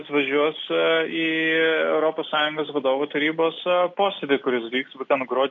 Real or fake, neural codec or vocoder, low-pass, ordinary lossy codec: real; none; 7.2 kHz; MP3, 64 kbps